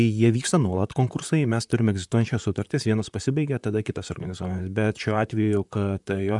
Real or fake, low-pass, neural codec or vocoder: fake; 10.8 kHz; vocoder, 44.1 kHz, 128 mel bands, Pupu-Vocoder